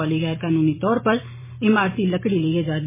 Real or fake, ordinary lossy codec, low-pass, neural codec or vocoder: fake; MP3, 16 kbps; 3.6 kHz; vocoder, 44.1 kHz, 128 mel bands every 256 samples, BigVGAN v2